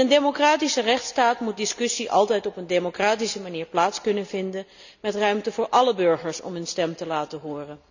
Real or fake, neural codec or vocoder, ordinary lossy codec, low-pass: real; none; none; 7.2 kHz